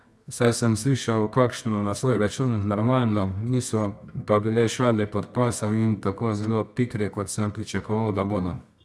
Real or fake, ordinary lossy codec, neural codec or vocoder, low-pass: fake; none; codec, 24 kHz, 0.9 kbps, WavTokenizer, medium music audio release; none